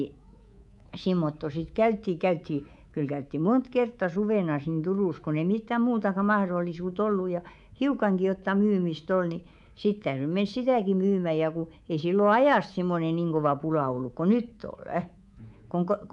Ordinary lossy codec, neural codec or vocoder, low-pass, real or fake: none; codec, 24 kHz, 3.1 kbps, DualCodec; 10.8 kHz; fake